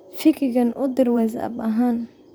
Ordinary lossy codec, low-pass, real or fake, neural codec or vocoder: none; none; fake; vocoder, 44.1 kHz, 128 mel bands, Pupu-Vocoder